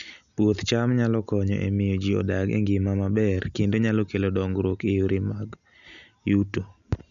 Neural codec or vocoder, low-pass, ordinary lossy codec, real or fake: none; 7.2 kHz; none; real